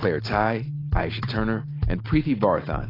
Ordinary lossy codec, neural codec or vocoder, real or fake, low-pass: AAC, 24 kbps; none; real; 5.4 kHz